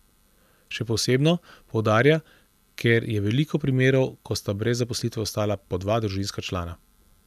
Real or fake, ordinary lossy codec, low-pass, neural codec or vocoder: real; none; 14.4 kHz; none